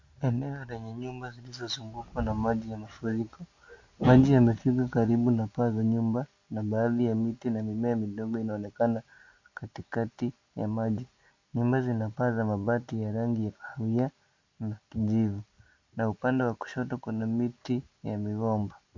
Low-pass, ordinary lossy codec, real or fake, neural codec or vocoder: 7.2 kHz; MP3, 48 kbps; real; none